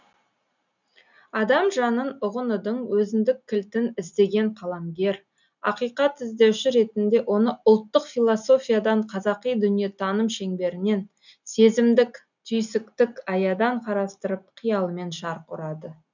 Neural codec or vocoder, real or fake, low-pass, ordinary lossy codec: none; real; 7.2 kHz; none